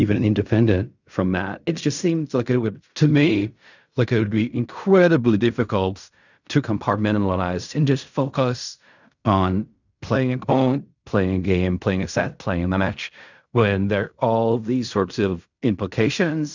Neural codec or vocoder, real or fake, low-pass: codec, 16 kHz in and 24 kHz out, 0.4 kbps, LongCat-Audio-Codec, fine tuned four codebook decoder; fake; 7.2 kHz